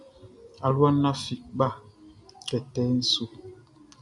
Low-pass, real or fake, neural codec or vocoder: 10.8 kHz; real; none